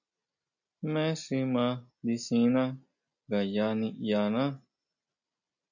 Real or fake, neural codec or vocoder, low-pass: real; none; 7.2 kHz